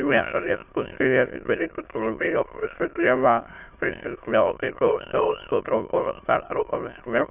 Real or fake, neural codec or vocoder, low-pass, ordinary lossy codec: fake; autoencoder, 22.05 kHz, a latent of 192 numbers a frame, VITS, trained on many speakers; 3.6 kHz; AAC, 32 kbps